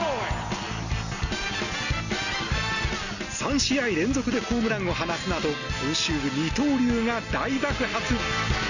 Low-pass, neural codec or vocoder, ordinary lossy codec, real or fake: 7.2 kHz; none; none; real